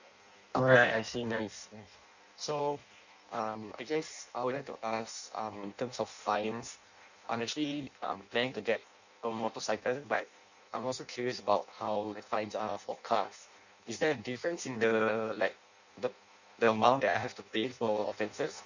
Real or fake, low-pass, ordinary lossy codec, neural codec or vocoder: fake; 7.2 kHz; none; codec, 16 kHz in and 24 kHz out, 0.6 kbps, FireRedTTS-2 codec